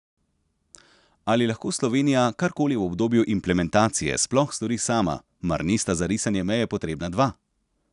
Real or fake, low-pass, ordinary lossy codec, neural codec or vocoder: real; 10.8 kHz; none; none